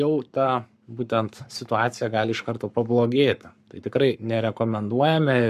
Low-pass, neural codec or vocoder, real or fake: 14.4 kHz; codec, 44.1 kHz, 7.8 kbps, Pupu-Codec; fake